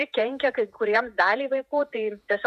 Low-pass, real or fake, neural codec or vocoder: 14.4 kHz; fake; vocoder, 48 kHz, 128 mel bands, Vocos